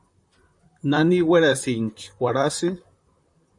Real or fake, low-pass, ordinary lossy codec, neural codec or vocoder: fake; 10.8 kHz; MP3, 96 kbps; vocoder, 44.1 kHz, 128 mel bands, Pupu-Vocoder